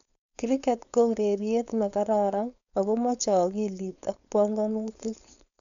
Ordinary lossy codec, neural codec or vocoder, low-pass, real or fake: none; codec, 16 kHz, 4.8 kbps, FACodec; 7.2 kHz; fake